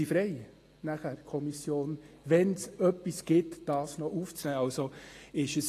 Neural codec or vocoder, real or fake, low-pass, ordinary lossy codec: none; real; 14.4 kHz; AAC, 48 kbps